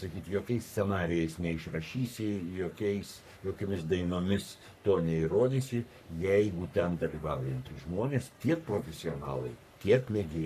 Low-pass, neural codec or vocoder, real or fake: 14.4 kHz; codec, 44.1 kHz, 3.4 kbps, Pupu-Codec; fake